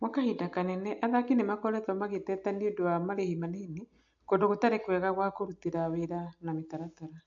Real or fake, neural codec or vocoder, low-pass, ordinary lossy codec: real; none; 7.2 kHz; AAC, 64 kbps